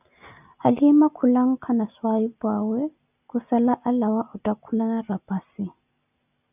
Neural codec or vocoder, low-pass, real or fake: none; 3.6 kHz; real